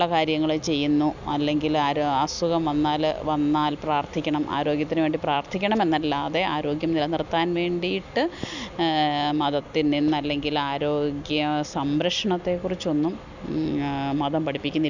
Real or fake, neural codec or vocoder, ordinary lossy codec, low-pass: real; none; none; 7.2 kHz